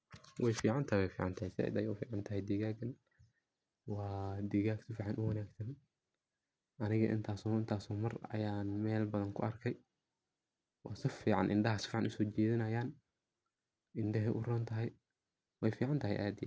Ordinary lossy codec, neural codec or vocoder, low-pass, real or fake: none; none; none; real